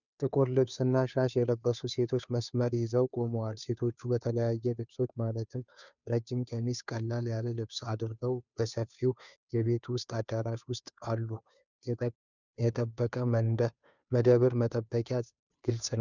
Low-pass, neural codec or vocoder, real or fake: 7.2 kHz; codec, 16 kHz, 2 kbps, FunCodec, trained on Chinese and English, 25 frames a second; fake